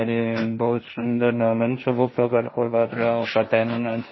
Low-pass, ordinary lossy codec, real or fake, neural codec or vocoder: 7.2 kHz; MP3, 24 kbps; fake; codec, 16 kHz, 1.1 kbps, Voila-Tokenizer